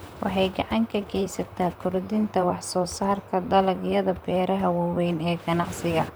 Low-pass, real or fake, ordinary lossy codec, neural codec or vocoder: none; fake; none; vocoder, 44.1 kHz, 128 mel bands, Pupu-Vocoder